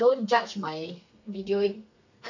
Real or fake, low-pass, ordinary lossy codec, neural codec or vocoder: fake; 7.2 kHz; none; codec, 32 kHz, 1.9 kbps, SNAC